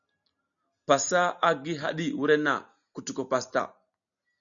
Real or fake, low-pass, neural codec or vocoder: real; 7.2 kHz; none